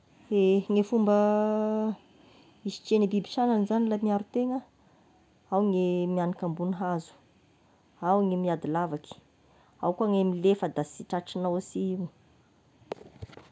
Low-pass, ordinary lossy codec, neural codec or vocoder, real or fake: none; none; none; real